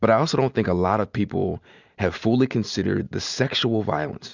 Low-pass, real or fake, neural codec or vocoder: 7.2 kHz; real; none